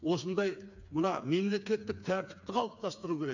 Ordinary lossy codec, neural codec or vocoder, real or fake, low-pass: none; codec, 16 kHz, 4 kbps, FreqCodec, smaller model; fake; 7.2 kHz